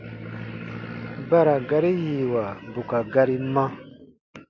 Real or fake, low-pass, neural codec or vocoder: real; 7.2 kHz; none